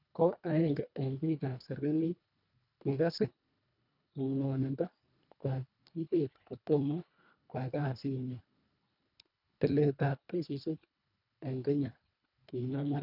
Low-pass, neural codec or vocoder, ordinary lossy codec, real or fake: 5.4 kHz; codec, 24 kHz, 1.5 kbps, HILCodec; none; fake